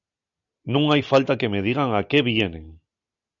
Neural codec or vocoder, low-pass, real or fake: none; 7.2 kHz; real